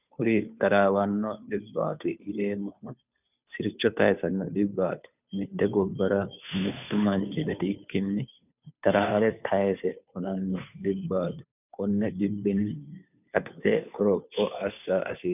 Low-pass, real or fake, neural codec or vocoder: 3.6 kHz; fake; codec, 16 kHz, 2 kbps, FunCodec, trained on Chinese and English, 25 frames a second